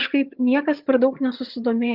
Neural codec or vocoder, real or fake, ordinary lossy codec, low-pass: vocoder, 22.05 kHz, 80 mel bands, HiFi-GAN; fake; Opus, 24 kbps; 5.4 kHz